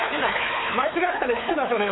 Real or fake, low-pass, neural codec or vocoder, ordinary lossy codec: fake; 7.2 kHz; codec, 16 kHz, 4 kbps, X-Codec, WavLM features, trained on Multilingual LibriSpeech; AAC, 16 kbps